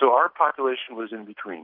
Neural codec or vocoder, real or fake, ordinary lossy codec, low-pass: none; real; AAC, 48 kbps; 5.4 kHz